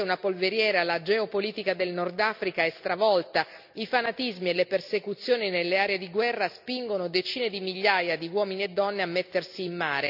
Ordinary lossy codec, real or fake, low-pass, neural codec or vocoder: none; real; 5.4 kHz; none